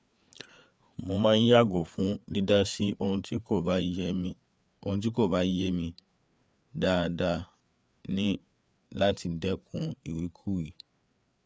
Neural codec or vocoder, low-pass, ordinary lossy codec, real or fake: codec, 16 kHz, 4 kbps, FreqCodec, larger model; none; none; fake